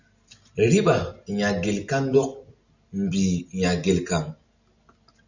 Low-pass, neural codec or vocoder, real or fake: 7.2 kHz; none; real